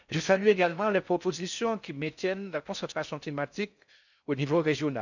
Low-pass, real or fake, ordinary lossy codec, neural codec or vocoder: 7.2 kHz; fake; none; codec, 16 kHz in and 24 kHz out, 0.6 kbps, FocalCodec, streaming, 4096 codes